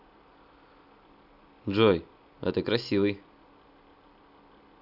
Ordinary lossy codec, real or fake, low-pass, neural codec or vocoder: none; real; 5.4 kHz; none